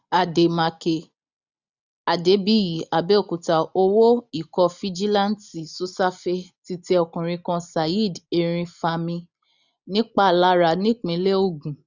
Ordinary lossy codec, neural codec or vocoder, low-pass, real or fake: none; none; 7.2 kHz; real